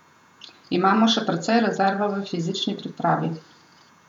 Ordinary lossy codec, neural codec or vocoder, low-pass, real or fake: none; vocoder, 48 kHz, 128 mel bands, Vocos; 19.8 kHz; fake